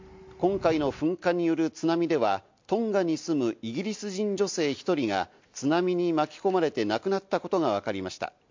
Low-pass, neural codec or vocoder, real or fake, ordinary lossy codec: 7.2 kHz; none; real; MP3, 48 kbps